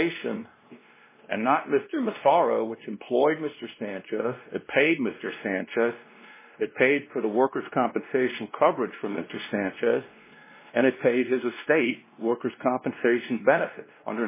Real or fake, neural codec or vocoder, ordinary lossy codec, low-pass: fake; codec, 16 kHz, 1 kbps, X-Codec, WavLM features, trained on Multilingual LibriSpeech; MP3, 16 kbps; 3.6 kHz